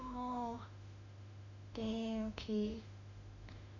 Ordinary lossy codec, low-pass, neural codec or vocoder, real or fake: none; 7.2 kHz; autoencoder, 48 kHz, 32 numbers a frame, DAC-VAE, trained on Japanese speech; fake